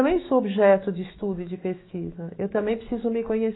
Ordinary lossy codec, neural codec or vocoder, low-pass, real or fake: AAC, 16 kbps; autoencoder, 48 kHz, 128 numbers a frame, DAC-VAE, trained on Japanese speech; 7.2 kHz; fake